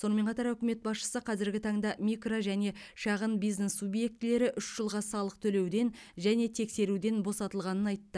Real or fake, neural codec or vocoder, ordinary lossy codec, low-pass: real; none; none; none